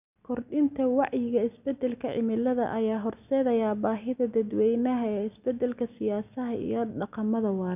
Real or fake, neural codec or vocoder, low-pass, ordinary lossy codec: real; none; 3.6 kHz; none